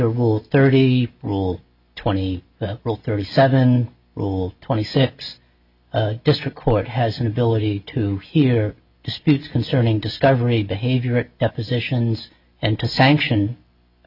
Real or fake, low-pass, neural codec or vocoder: real; 5.4 kHz; none